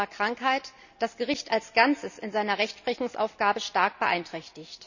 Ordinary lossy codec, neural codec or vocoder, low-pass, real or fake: none; none; 7.2 kHz; real